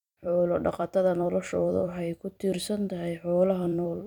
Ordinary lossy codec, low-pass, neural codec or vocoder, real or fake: none; 19.8 kHz; vocoder, 44.1 kHz, 128 mel bands every 256 samples, BigVGAN v2; fake